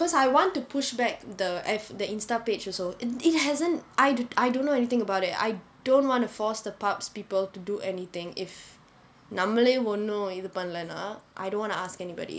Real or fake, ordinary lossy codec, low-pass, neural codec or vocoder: real; none; none; none